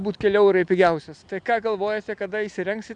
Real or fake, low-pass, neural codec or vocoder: real; 9.9 kHz; none